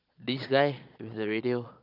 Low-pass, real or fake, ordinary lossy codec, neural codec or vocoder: 5.4 kHz; fake; none; vocoder, 22.05 kHz, 80 mel bands, Vocos